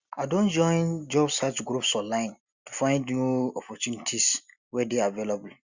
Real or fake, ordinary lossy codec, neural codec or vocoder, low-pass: real; Opus, 64 kbps; none; 7.2 kHz